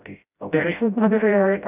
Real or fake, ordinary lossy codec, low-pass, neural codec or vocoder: fake; none; 3.6 kHz; codec, 16 kHz, 0.5 kbps, FreqCodec, smaller model